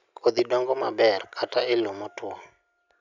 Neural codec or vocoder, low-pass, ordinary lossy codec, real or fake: none; 7.2 kHz; none; real